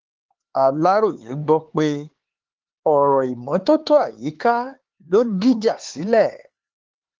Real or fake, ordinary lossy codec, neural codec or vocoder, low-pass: fake; Opus, 16 kbps; codec, 16 kHz, 4 kbps, X-Codec, HuBERT features, trained on LibriSpeech; 7.2 kHz